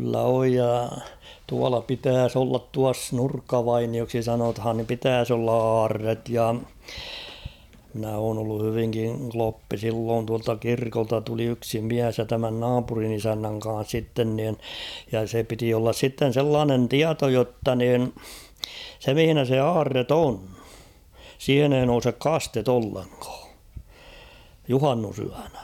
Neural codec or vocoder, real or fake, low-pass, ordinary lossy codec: none; real; 19.8 kHz; none